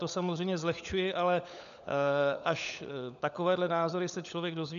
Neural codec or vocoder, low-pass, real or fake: codec, 16 kHz, 16 kbps, FunCodec, trained on LibriTTS, 50 frames a second; 7.2 kHz; fake